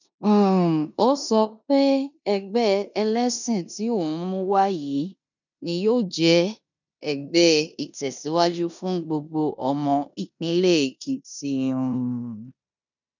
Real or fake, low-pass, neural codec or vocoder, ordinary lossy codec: fake; 7.2 kHz; codec, 16 kHz in and 24 kHz out, 0.9 kbps, LongCat-Audio-Codec, four codebook decoder; none